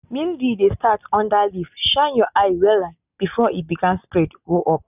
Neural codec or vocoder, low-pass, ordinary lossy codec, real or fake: none; 3.6 kHz; none; real